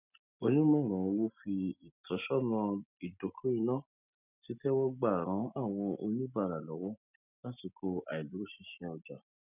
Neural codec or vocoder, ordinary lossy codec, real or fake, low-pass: none; none; real; 3.6 kHz